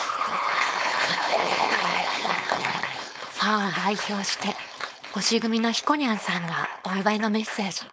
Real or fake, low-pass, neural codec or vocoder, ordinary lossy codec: fake; none; codec, 16 kHz, 4.8 kbps, FACodec; none